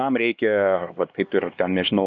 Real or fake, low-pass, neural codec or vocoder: fake; 7.2 kHz; codec, 16 kHz, 2 kbps, X-Codec, HuBERT features, trained on LibriSpeech